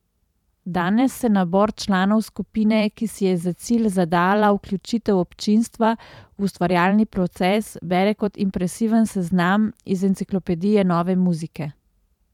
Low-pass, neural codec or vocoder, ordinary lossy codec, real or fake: 19.8 kHz; vocoder, 44.1 kHz, 128 mel bands every 512 samples, BigVGAN v2; none; fake